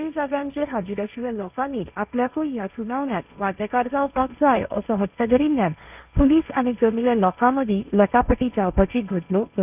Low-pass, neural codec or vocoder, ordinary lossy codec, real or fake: 3.6 kHz; codec, 16 kHz, 1.1 kbps, Voila-Tokenizer; none; fake